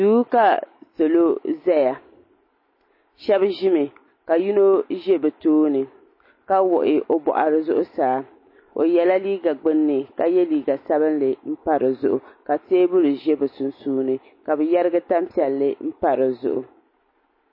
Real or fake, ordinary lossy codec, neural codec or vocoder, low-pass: real; MP3, 24 kbps; none; 5.4 kHz